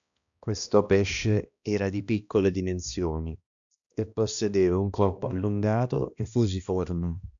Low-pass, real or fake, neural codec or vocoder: 7.2 kHz; fake; codec, 16 kHz, 1 kbps, X-Codec, HuBERT features, trained on balanced general audio